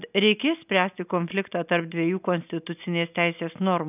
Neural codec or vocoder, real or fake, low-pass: none; real; 3.6 kHz